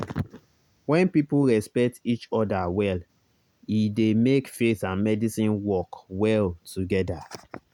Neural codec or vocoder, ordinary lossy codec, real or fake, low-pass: none; none; real; 19.8 kHz